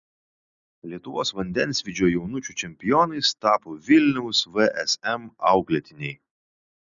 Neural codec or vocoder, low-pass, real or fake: none; 7.2 kHz; real